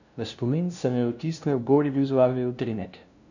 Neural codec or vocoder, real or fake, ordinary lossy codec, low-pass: codec, 16 kHz, 0.5 kbps, FunCodec, trained on LibriTTS, 25 frames a second; fake; none; 7.2 kHz